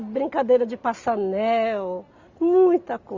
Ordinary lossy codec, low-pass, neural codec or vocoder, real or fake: Opus, 64 kbps; 7.2 kHz; none; real